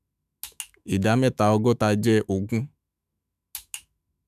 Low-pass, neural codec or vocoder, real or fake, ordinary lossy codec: 14.4 kHz; autoencoder, 48 kHz, 128 numbers a frame, DAC-VAE, trained on Japanese speech; fake; none